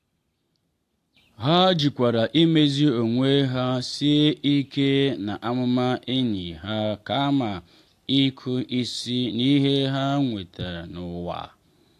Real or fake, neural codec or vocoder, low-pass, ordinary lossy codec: real; none; 14.4 kHz; AAC, 64 kbps